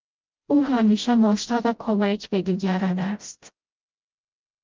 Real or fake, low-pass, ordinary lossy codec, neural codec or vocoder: fake; 7.2 kHz; Opus, 24 kbps; codec, 16 kHz, 0.5 kbps, FreqCodec, smaller model